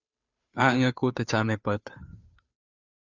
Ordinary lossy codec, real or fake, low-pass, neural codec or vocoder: Opus, 64 kbps; fake; 7.2 kHz; codec, 16 kHz, 2 kbps, FunCodec, trained on Chinese and English, 25 frames a second